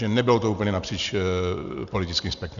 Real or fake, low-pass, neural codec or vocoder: real; 7.2 kHz; none